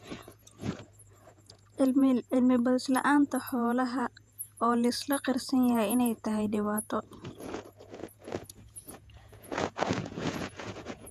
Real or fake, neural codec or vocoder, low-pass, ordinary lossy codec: fake; vocoder, 48 kHz, 128 mel bands, Vocos; 14.4 kHz; none